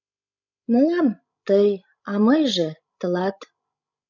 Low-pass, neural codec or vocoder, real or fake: 7.2 kHz; codec, 16 kHz, 16 kbps, FreqCodec, larger model; fake